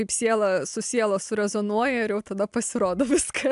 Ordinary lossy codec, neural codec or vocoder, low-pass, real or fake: AAC, 96 kbps; none; 10.8 kHz; real